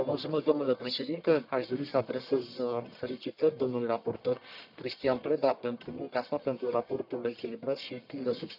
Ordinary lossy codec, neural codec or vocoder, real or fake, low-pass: none; codec, 44.1 kHz, 1.7 kbps, Pupu-Codec; fake; 5.4 kHz